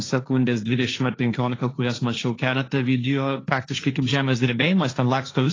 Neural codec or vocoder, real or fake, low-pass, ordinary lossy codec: codec, 16 kHz, 1.1 kbps, Voila-Tokenizer; fake; 7.2 kHz; AAC, 32 kbps